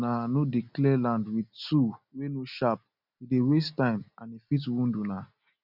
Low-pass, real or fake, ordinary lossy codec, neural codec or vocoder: 5.4 kHz; real; none; none